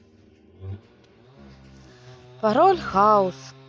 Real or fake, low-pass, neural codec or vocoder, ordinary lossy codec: real; none; none; none